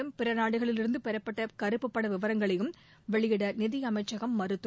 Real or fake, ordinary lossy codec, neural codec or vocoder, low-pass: real; none; none; none